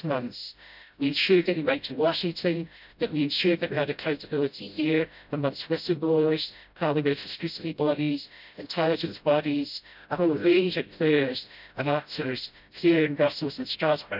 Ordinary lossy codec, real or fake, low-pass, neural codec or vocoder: none; fake; 5.4 kHz; codec, 16 kHz, 0.5 kbps, FreqCodec, smaller model